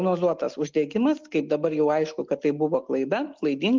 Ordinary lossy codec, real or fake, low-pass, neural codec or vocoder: Opus, 24 kbps; real; 7.2 kHz; none